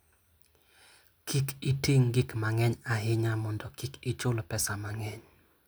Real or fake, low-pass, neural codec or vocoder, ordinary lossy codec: real; none; none; none